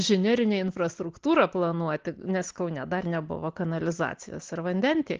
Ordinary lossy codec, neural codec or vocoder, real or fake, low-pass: Opus, 16 kbps; none; real; 7.2 kHz